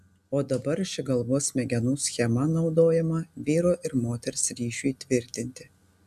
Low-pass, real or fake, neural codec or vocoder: 14.4 kHz; real; none